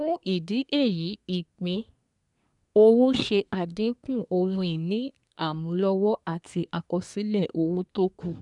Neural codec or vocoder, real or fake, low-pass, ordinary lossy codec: codec, 24 kHz, 1 kbps, SNAC; fake; 10.8 kHz; none